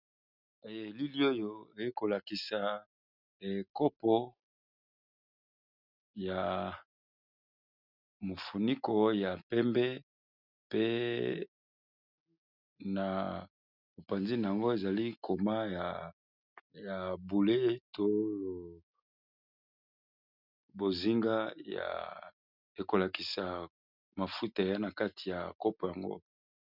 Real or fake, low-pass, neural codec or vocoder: real; 5.4 kHz; none